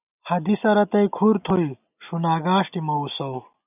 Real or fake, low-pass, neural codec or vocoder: real; 3.6 kHz; none